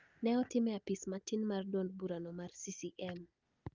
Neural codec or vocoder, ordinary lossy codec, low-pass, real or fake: none; Opus, 24 kbps; 7.2 kHz; real